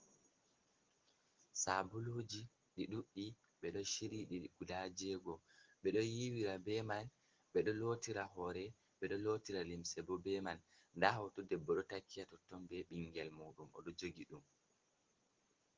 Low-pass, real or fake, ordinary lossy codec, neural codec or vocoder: 7.2 kHz; real; Opus, 16 kbps; none